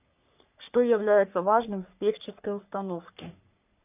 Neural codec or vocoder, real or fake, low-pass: codec, 44.1 kHz, 3.4 kbps, Pupu-Codec; fake; 3.6 kHz